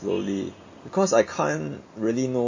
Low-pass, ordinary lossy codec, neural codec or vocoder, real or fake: 7.2 kHz; MP3, 32 kbps; none; real